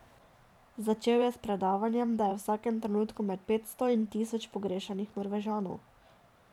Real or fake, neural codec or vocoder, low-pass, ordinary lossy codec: fake; vocoder, 44.1 kHz, 128 mel bands every 512 samples, BigVGAN v2; 19.8 kHz; none